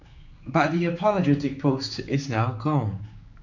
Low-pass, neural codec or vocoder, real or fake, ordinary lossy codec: 7.2 kHz; codec, 16 kHz, 4 kbps, X-Codec, HuBERT features, trained on general audio; fake; none